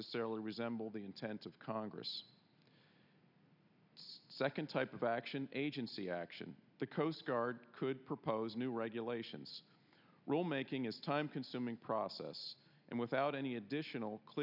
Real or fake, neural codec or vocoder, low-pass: real; none; 5.4 kHz